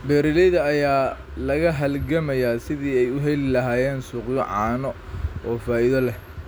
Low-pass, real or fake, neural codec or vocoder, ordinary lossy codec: none; real; none; none